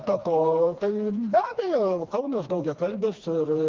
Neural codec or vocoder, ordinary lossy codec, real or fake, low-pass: codec, 16 kHz, 2 kbps, FreqCodec, smaller model; Opus, 32 kbps; fake; 7.2 kHz